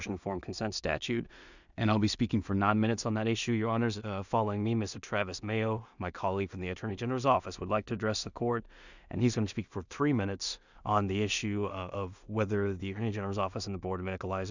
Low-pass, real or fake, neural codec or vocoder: 7.2 kHz; fake; codec, 16 kHz in and 24 kHz out, 0.4 kbps, LongCat-Audio-Codec, two codebook decoder